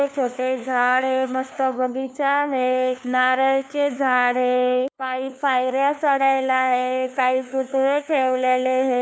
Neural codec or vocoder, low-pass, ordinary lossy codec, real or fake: codec, 16 kHz, 2 kbps, FunCodec, trained on LibriTTS, 25 frames a second; none; none; fake